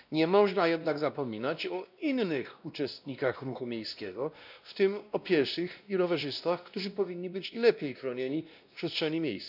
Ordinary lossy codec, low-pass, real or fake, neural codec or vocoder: none; 5.4 kHz; fake; codec, 16 kHz, 1 kbps, X-Codec, WavLM features, trained on Multilingual LibriSpeech